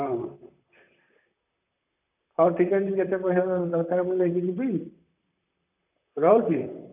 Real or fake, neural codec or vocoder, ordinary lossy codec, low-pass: fake; vocoder, 44.1 kHz, 128 mel bands, Pupu-Vocoder; none; 3.6 kHz